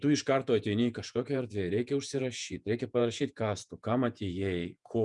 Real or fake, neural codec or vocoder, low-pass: fake; vocoder, 48 kHz, 128 mel bands, Vocos; 10.8 kHz